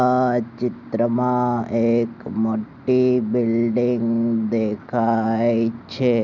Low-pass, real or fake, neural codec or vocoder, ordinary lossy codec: 7.2 kHz; real; none; none